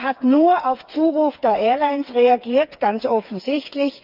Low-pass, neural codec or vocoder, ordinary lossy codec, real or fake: 5.4 kHz; codec, 16 kHz, 4 kbps, FreqCodec, smaller model; Opus, 24 kbps; fake